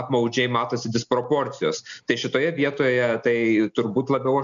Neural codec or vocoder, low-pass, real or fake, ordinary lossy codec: none; 7.2 kHz; real; MP3, 96 kbps